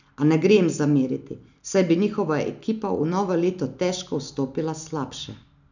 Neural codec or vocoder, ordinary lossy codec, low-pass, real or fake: none; none; 7.2 kHz; real